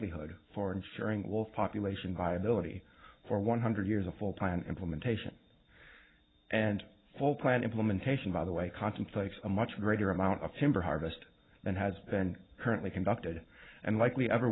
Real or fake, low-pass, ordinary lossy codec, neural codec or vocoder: real; 7.2 kHz; AAC, 16 kbps; none